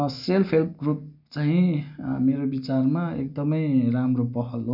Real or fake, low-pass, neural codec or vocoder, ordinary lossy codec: real; 5.4 kHz; none; none